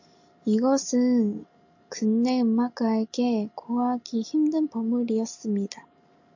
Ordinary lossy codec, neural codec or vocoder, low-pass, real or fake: AAC, 48 kbps; none; 7.2 kHz; real